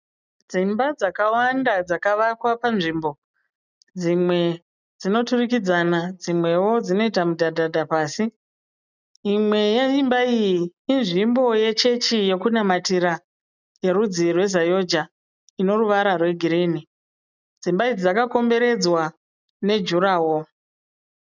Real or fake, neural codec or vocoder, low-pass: real; none; 7.2 kHz